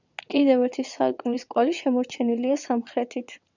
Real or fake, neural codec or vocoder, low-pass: fake; vocoder, 22.05 kHz, 80 mel bands, WaveNeXt; 7.2 kHz